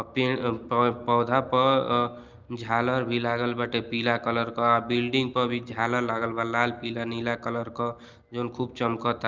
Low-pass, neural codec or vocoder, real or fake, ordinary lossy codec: 7.2 kHz; none; real; Opus, 24 kbps